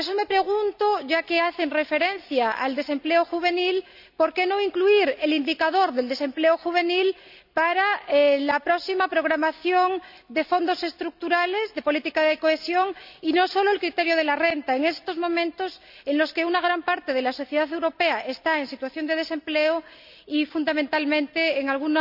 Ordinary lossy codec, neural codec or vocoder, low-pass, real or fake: none; none; 5.4 kHz; real